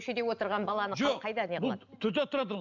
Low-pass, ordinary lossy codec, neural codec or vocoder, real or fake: 7.2 kHz; none; none; real